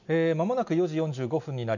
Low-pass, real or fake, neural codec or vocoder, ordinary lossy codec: 7.2 kHz; real; none; none